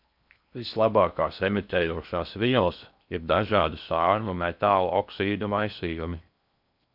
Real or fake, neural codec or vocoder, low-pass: fake; codec, 16 kHz in and 24 kHz out, 0.6 kbps, FocalCodec, streaming, 4096 codes; 5.4 kHz